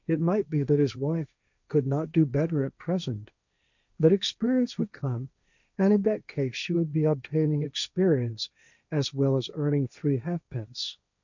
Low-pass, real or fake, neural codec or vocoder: 7.2 kHz; fake; codec, 16 kHz, 1.1 kbps, Voila-Tokenizer